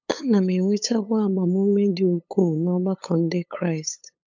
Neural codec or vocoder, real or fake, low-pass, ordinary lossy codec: codec, 16 kHz, 8 kbps, FunCodec, trained on LibriTTS, 25 frames a second; fake; 7.2 kHz; MP3, 64 kbps